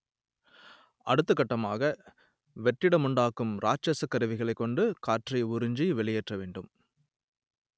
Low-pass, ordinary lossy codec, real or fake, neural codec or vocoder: none; none; real; none